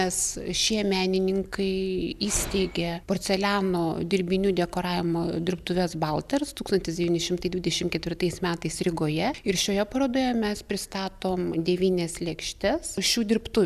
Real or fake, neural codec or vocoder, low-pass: real; none; 14.4 kHz